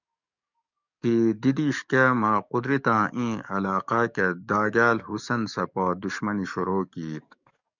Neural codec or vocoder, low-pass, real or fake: codec, 44.1 kHz, 7.8 kbps, DAC; 7.2 kHz; fake